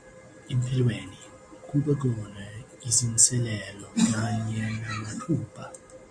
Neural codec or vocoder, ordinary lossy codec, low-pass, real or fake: none; AAC, 64 kbps; 9.9 kHz; real